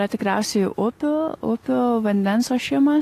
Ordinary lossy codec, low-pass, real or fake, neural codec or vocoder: AAC, 48 kbps; 14.4 kHz; real; none